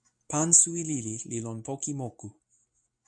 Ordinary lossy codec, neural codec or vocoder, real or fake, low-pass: MP3, 96 kbps; none; real; 9.9 kHz